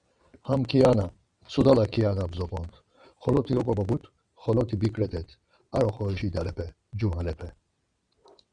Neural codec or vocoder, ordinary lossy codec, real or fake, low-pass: vocoder, 22.05 kHz, 80 mel bands, WaveNeXt; Opus, 64 kbps; fake; 9.9 kHz